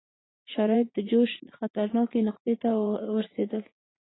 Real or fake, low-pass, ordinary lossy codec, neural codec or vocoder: fake; 7.2 kHz; AAC, 16 kbps; vocoder, 44.1 kHz, 80 mel bands, Vocos